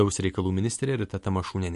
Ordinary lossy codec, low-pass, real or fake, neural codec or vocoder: MP3, 48 kbps; 14.4 kHz; real; none